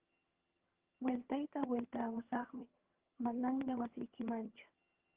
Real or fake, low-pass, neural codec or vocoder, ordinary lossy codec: fake; 3.6 kHz; vocoder, 22.05 kHz, 80 mel bands, HiFi-GAN; Opus, 16 kbps